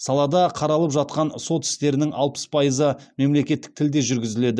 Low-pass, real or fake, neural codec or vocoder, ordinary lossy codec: none; real; none; none